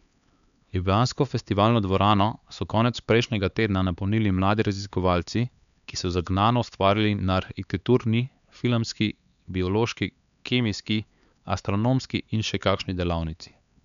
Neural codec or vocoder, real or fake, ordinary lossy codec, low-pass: codec, 16 kHz, 4 kbps, X-Codec, HuBERT features, trained on LibriSpeech; fake; none; 7.2 kHz